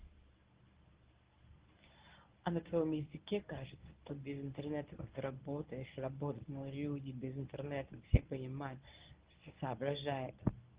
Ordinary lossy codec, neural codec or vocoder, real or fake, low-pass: Opus, 16 kbps; codec, 24 kHz, 0.9 kbps, WavTokenizer, medium speech release version 1; fake; 3.6 kHz